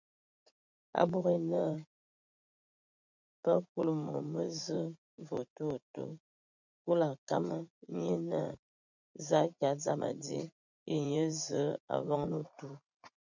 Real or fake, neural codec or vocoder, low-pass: fake; vocoder, 44.1 kHz, 80 mel bands, Vocos; 7.2 kHz